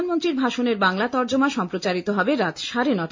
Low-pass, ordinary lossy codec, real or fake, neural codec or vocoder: 7.2 kHz; MP3, 48 kbps; real; none